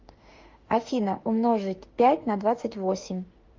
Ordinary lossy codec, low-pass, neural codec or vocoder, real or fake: Opus, 32 kbps; 7.2 kHz; autoencoder, 48 kHz, 32 numbers a frame, DAC-VAE, trained on Japanese speech; fake